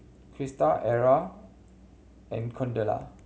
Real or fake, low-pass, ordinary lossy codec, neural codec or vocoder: real; none; none; none